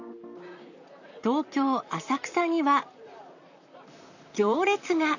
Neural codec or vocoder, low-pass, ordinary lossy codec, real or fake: vocoder, 44.1 kHz, 128 mel bands, Pupu-Vocoder; 7.2 kHz; none; fake